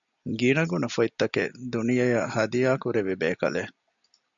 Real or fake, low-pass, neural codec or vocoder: real; 7.2 kHz; none